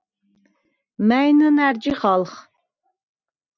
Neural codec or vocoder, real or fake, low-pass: none; real; 7.2 kHz